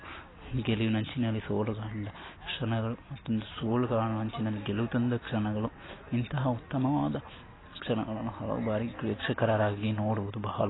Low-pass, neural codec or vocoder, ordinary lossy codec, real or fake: 7.2 kHz; none; AAC, 16 kbps; real